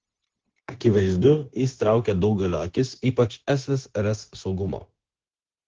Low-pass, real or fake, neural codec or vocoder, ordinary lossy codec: 7.2 kHz; fake; codec, 16 kHz, 0.9 kbps, LongCat-Audio-Codec; Opus, 16 kbps